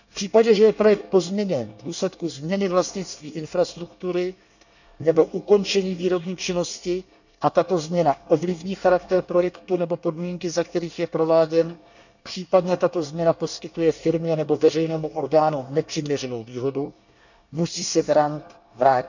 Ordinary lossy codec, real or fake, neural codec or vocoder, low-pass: none; fake; codec, 24 kHz, 1 kbps, SNAC; 7.2 kHz